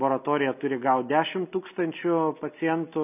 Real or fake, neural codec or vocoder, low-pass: real; none; 3.6 kHz